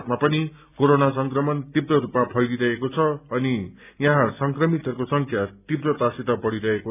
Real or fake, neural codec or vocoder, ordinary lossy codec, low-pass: real; none; none; 3.6 kHz